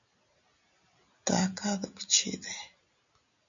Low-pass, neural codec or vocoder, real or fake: 7.2 kHz; none; real